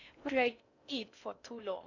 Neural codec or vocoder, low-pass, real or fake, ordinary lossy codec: codec, 16 kHz in and 24 kHz out, 0.6 kbps, FocalCodec, streaming, 2048 codes; 7.2 kHz; fake; none